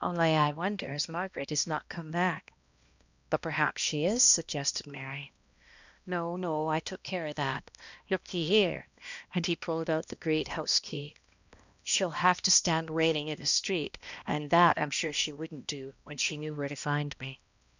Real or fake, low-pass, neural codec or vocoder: fake; 7.2 kHz; codec, 16 kHz, 1 kbps, X-Codec, HuBERT features, trained on balanced general audio